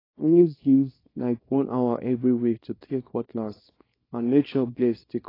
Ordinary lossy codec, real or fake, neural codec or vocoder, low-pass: AAC, 24 kbps; fake; codec, 24 kHz, 0.9 kbps, WavTokenizer, small release; 5.4 kHz